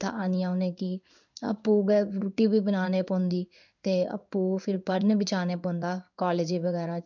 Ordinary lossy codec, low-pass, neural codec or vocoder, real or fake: none; 7.2 kHz; codec, 16 kHz in and 24 kHz out, 1 kbps, XY-Tokenizer; fake